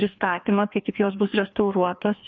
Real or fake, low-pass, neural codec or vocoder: fake; 7.2 kHz; codec, 16 kHz, 2 kbps, FreqCodec, larger model